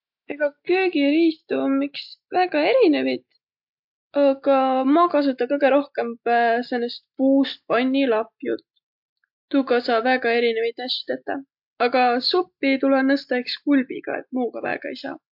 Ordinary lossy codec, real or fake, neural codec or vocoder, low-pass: MP3, 48 kbps; real; none; 5.4 kHz